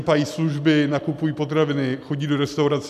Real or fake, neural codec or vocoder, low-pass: real; none; 14.4 kHz